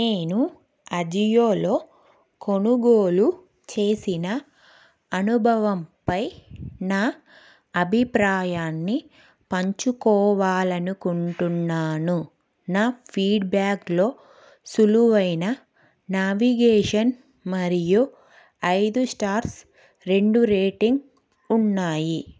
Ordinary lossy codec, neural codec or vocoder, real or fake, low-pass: none; none; real; none